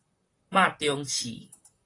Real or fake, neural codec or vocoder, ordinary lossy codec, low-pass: fake; vocoder, 44.1 kHz, 128 mel bands, Pupu-Vocoder; AAC, 32 kbps; 10.8 kHz